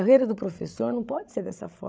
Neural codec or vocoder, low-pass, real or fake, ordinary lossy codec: codec, 16 kHz, 16 kbps, FreqCodec, larger model; none; fake; none